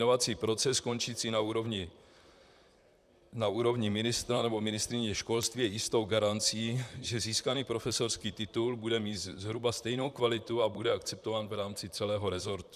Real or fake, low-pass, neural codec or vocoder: fake; 14.4 kHz; vocoder, 44.1 kHz, 128 mel bands, Pupu-Vocoder